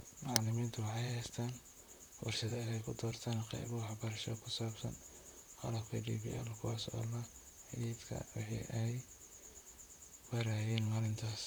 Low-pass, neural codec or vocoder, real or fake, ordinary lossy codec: none; vocoder, 44.1 kHz, 128 mel bands, Pupu-Vocoder; fake; none